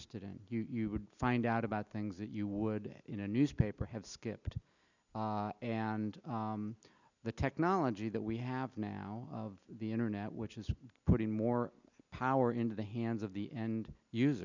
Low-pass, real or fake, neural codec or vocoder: 7.2 kHz; real; none